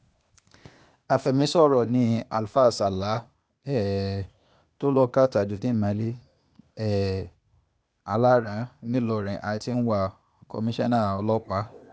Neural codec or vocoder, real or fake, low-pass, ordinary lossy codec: codec, 16 kHz, 0.8 kbps, ZipCodec; fake; none; none